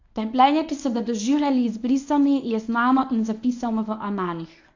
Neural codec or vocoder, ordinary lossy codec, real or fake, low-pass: codec, 24 kHz, 0.9 kbps, WavTokenizer, medium speech release version 1; AAC, 48 kbps; fake; 7.2 kHz